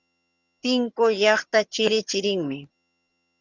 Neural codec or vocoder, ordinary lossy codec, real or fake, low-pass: vocoder, 22.05 kHz, 80 mel bands, HiFi-GAN; Opus, 64 kbps; fake; 7.2 kHz